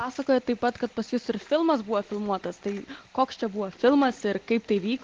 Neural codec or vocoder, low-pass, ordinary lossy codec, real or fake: none; 7.2 kHz; Opus, 16 kbps; real